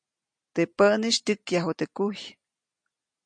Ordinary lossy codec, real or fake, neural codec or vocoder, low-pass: MP3, 48 kbps; real; none; 9.9 kHz